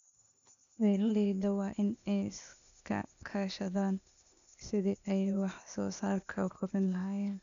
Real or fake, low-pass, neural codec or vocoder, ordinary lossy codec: fake; 7.2 kHz; codec, 16 kHz, 0.8 kbps, ZipCodec; none